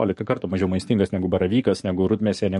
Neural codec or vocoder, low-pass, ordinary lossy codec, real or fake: codec, 44.1 kHz, 7.8 kbps, DAC; 14.4 kHz; MP3, 48 kbps; fake